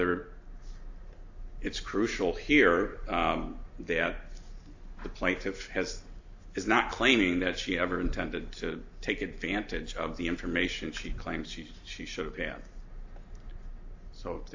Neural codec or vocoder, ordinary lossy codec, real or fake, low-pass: none; AAC, 48 kbps; real; 7.2 kHz